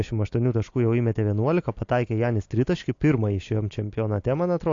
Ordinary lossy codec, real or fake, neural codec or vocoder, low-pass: AAC, 48 kbps; real; none; 7.2 kHz